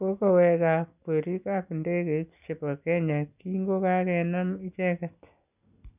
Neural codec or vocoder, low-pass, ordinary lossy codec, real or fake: none; 3.6 kHz; none; real